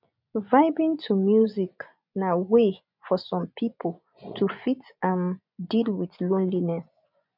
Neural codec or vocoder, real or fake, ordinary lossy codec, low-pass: vocoder, 24 kHz, 100 mel bands, Vocos; fake; none; 5.4 kHz